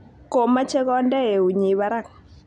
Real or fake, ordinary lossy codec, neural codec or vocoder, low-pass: real; none; none; 10.8 kHz